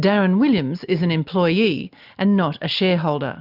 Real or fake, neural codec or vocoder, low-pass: real; none; 5.4 kHz